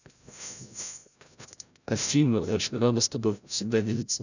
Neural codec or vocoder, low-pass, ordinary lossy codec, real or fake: codec, 16 kHz, 0.5 kbps, FreqCodec, larger model; 7.2 kHz; none; fake